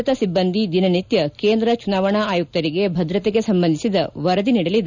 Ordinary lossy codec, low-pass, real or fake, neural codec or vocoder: none; 7.2 kHz; real; none